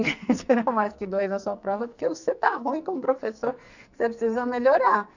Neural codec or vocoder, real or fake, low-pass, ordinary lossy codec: codec, 44.1 kHz, 2.6 kbps, SNAC; fake; 7.2 kHz; none